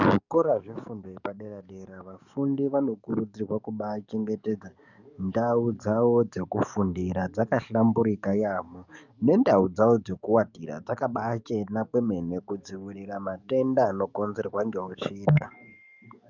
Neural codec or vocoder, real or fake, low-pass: codec, 44.1 kHz, 7.8 kbps, DAC; fake; 7.2 kHz